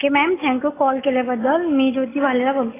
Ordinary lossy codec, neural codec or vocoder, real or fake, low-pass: AAC, 16 kbps; none; real; 3.6 kHz